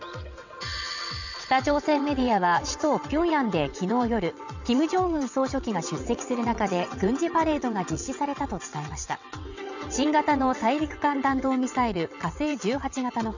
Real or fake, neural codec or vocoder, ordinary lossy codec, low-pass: fake; vocoder, 22.05 kHz, 80 mel bands, WaveNeXt; none; 7.2 kHz